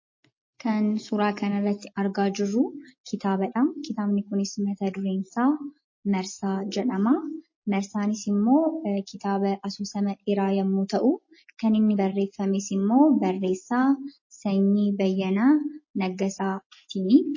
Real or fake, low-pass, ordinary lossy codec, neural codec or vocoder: real; 7.2 kHz; MP3, 32 kbps; none